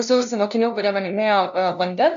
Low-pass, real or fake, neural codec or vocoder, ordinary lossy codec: 7.2 kHz; fake; codec, 16 kHz, 0.5 kbps, FunCodec, trained on LibriTTS, 25 frames a second; MP3, 96 kbps